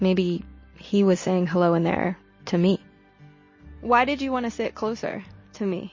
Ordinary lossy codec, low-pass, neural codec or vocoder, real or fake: MP3, 32 kbps; 7.2 kHz; none; real